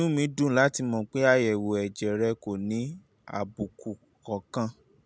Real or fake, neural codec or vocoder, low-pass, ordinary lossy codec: real; none; none; none